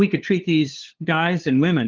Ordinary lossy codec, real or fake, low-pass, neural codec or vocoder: Opus, 16 kbps; fake; 7.2 kHz; codec, 16 kHz, 2 kbps, FunCodec, trained on LibriTTS, 25 frames a second